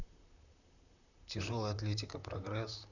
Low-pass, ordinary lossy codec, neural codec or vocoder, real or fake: 7.2 kHz; none; vocoder, 44.1 kHz, 128 mel bands, Pupu-Vocoder; fake